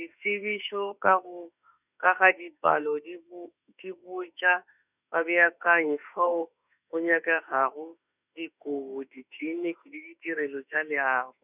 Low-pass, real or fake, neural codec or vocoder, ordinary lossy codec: 3.6 kHz; fake; autoencoder, 48 kHz, 32 numbers a frame, DAC-VAE, trained on Japanese speech; none